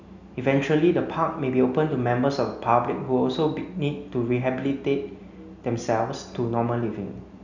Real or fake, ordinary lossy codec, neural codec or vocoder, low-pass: real; none; none; 7.2 kHz